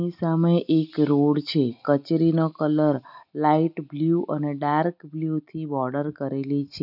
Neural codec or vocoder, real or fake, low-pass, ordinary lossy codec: none; real; 5.4 kHz; none